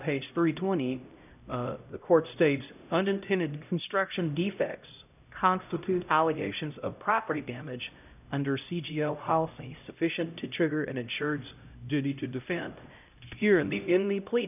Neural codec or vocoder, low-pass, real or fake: codec, 16 kHz, 0.5 kbps, X-Codec, HuBERT features, trained on LibriSpeech; 3.6 kHz; fake